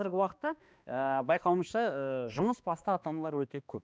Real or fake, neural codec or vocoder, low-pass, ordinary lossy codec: fake; codec, 16 kHz, 2 kbps, X-Codec, HuBERT features, trained on balanced general audio; none; none